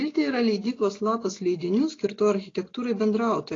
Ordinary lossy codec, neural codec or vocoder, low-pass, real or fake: AAC, 32 kbps; none; 7.2 kHz; real